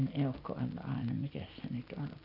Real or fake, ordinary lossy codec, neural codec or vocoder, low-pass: real; none; none; 5.4 kHz